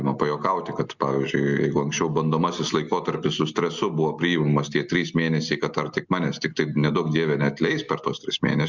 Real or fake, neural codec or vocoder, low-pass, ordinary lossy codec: real; none; 7.2 kHz; Opus, 64 kbps